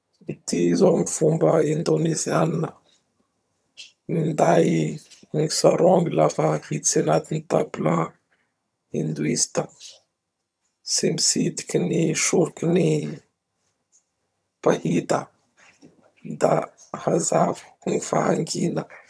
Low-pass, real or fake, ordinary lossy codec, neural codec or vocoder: none; fake; none; vocoder, 22.05 kHz, 80 mel bands, HiFi-GAN